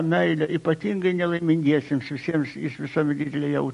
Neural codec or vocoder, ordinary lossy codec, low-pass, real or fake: none; MP3, 48 kbps; 10.8 kHz; real